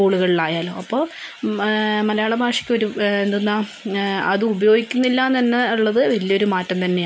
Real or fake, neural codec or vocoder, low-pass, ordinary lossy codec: real; none; none; none